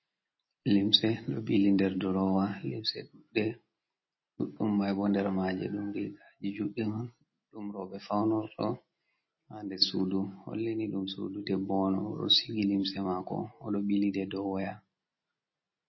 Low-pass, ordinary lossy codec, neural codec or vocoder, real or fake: 7.2 kHz; MP3, 24 kbps; none; real